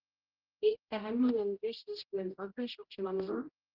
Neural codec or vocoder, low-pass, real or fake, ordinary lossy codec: codec, 16 kHz, 0.5 kbps, X-Codec, HuBERT features, trained on general audio; 5.4 kHz; fake; Opus, 16 kbps